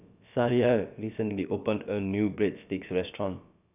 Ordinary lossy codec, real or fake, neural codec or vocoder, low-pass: none; fake; codec, 16 kHz, about 1 kbps, DyCAST, with the encoder's durations; 3.6 kHz